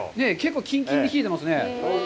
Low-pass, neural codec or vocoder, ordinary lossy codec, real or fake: none; none; none; real